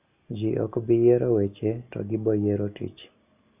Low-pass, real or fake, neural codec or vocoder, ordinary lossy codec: 3.6 kHz; real; none; none